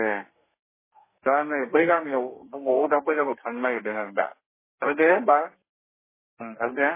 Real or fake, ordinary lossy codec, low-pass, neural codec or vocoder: fake; MP3, 16 kbps; 3.6 kHz; codec, 32 kHz, 1.9 kbps, SNAC